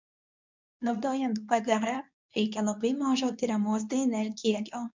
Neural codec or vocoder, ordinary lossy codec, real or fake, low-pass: codec, 24 kHz, 0.9 kbps, WavTokenizer, medium speech release version 2; MP3, 64 kbps; fake; 7.2 kHz